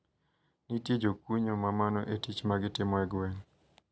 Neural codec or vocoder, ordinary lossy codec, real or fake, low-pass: none; none; real; none